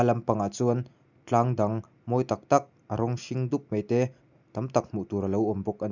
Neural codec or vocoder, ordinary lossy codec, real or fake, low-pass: none; none; real; 7.2 kHz